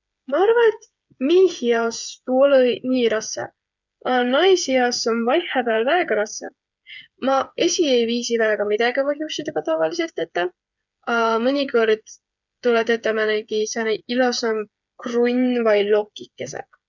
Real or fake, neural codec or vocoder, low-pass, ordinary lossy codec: fake; codec, 16 kHz, 8 kbps, FreqCodec, smaller model; 7.2 kHz; none